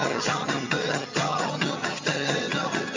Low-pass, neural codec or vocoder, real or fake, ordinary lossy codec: 7.2 kHz; vocoder, 22.05 kHz, 80 mel bands, HiFi-GAN; fake; MP3, 64 kbps